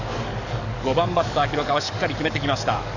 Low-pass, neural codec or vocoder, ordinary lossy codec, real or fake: 7.2 kHz; codec, 44.1 kHz, 7.8 kbps, DAC; none; fake